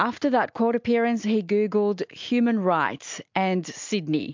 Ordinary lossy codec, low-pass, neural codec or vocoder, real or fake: MP3, 64 kbps; 7.2 kHz; none; real